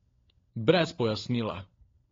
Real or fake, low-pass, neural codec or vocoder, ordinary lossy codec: fake; 7.2 kHz; codec, 16 kHz, 16 kbps, FunCodec, trained on LibriTTS, 50 frames a second; AAC, 32 kbps